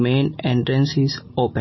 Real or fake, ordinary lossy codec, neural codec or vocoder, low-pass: real; MP3, 24 kbps; none; 7.2 kHz